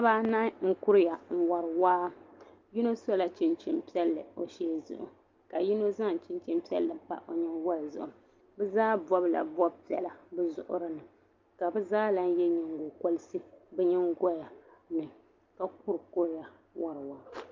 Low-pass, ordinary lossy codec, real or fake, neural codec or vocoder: 7.2 kHz; Opus, 32 kbps; real; none